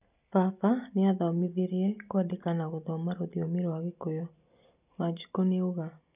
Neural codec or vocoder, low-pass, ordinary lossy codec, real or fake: none; 3.6 kHz; none; real